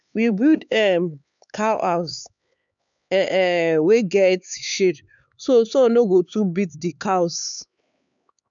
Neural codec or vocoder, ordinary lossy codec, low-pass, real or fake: codec, 16 kHz, 4 kbps, X-Codec, HuBERT features, trained on LibriSpeech; none; 7.2 kHz; fake